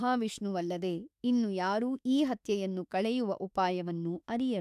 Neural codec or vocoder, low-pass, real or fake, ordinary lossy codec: autoencoder, 48 kHz, 32 numbers a frame, DAC-VAE, trained on Japanese speech; 14.4 kHz; fake; none